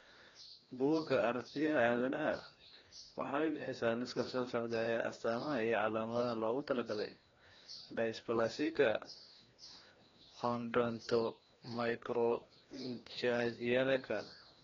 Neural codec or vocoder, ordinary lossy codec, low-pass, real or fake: codec, 16 kHz, 1 kbps, FreqCodec, larger model; AAC, 32 kbps; 7.2 kHz; fake